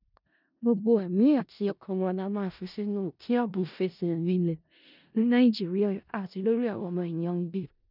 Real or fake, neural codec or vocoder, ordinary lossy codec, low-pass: fake; codec, 16 kHz in and 24 kHz out, 0.4 kbps, LongCat-Audio-Codec, four codebook decoder; none; 5.4 kHz